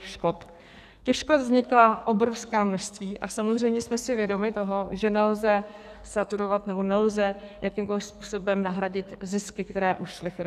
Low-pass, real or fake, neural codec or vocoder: 14.4 kHz; fake; codec, 44.1 kHz, 2.6 kbps, SNAC